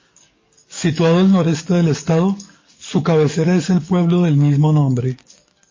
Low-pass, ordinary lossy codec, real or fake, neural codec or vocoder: 7.2 kHz; MP3, 32 kbps; fake; codec, 44.1 kHz, 7.8 kbps, DAC